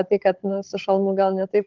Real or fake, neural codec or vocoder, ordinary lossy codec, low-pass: fake; codec, 16 kHz, 16 kbps, FunCodec, trained on Chinese and English, 50 frames a second; Opus, 16 kbps; 7.2 kHz